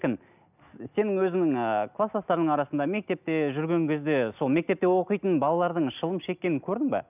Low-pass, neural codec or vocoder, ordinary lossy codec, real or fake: 3.6 kHz; none; none; real